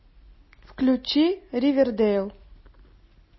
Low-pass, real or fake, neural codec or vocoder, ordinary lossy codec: 7.2 kHz; real; none; MP3, 24 kbps